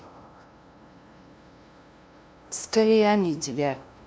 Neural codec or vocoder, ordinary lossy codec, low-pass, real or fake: codec, 16 kHz, 0.5 kbps, FunCodec, trained on LibriTTS, 25 frames a second; none; none; fake